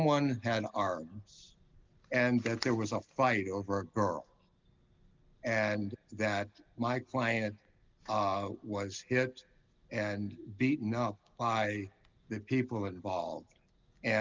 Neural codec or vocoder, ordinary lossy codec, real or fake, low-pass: none; Opus, 24 kbps; real; 7.2 kHz